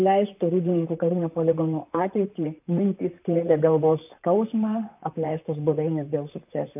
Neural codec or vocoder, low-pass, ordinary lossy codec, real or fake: vocoder, 44.1 kHz, 128 mel bands, Pupu-Vocoder; 3.6 kHz; AAC, 32 kbps; fake